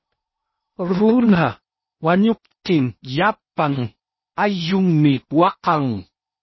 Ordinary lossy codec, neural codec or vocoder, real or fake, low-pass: MP3, 24 kbps; codec, 16 kHz in and 24 kHz out, 0.8 kbps, FocalCodec, streaming, 65536 codes; fake; 7.2 kHz